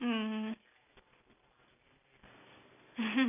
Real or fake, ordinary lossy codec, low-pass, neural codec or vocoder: real; none; 3.6 kHz; none